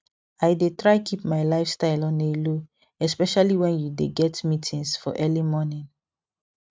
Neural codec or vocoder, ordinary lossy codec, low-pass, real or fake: none; none; none; real